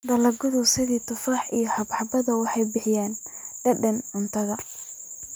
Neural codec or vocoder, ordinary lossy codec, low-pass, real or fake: none; none; none; real